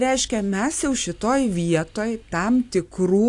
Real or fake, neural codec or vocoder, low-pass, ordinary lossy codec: real; none; 10.8 kHz; AAC, 64 kbps